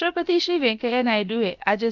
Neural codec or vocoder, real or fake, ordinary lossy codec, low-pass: codec, 16 kHz, about 1 kbps, DyCAST, with the encoder's durations; fake; none; 7.2 kHz